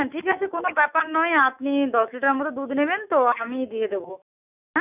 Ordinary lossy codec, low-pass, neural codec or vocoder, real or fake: none; 3.6 kHz; autoencoder, 48 kHz, 128 numbers a frame, DAC-VAE, trained on Japanese speech; fake